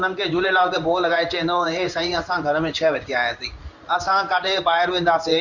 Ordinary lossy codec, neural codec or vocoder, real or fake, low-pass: none; codec, 16 kHz in and 24 kHz out, 1 kbps, XY-Tokenizer; fake; 7.2 kHz